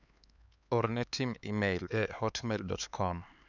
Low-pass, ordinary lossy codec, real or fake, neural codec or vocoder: 7.2 kHz; none; fake; codec, 16 kHz, 4 kbps, X-Codec, HuBERT features, trained on LibriSpeech